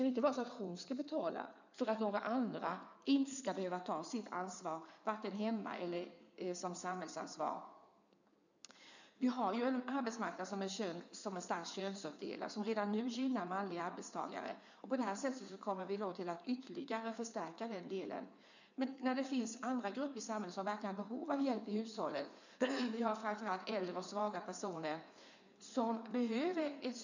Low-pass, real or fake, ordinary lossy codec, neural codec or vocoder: 7.2 kHz; fake; none; codec, 16 kHz in and 24 kHz out, 2.2 kbps, FireRedTTS-2 codec